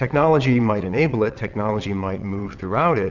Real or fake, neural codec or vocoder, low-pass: fake; vocoder, 22.05 kHz, 80 mel bands, WaveNeXt; 7.2 kHz